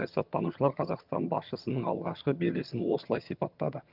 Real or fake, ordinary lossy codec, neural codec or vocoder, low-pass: fake; Opus, 24 kbps; vocoder, 22.05 kHz, 80 mel bands, HiFi-GAN; 5.4 kHz